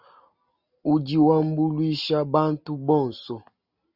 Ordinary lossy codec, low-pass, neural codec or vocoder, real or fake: Opus, 64 kbps; 5.4 kHz; none; real